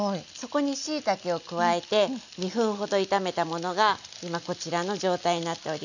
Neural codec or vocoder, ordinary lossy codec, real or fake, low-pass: none; none; real; 7.2 kHz